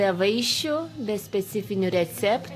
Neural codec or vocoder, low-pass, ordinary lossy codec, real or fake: none; 14.4 kHz; AAC, 64 kbps; real